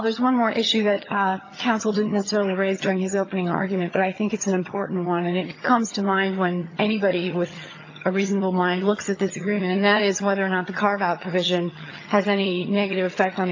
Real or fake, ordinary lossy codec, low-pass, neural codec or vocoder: fake; AAC, 48 kbps; 7.2 kHz; vocoder, 22.05 kHz, 80 mel bands, HiFi-GAN